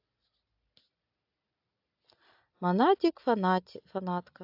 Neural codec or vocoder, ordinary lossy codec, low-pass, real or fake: none; AAC, 48 kbps; 5.4 kHz; real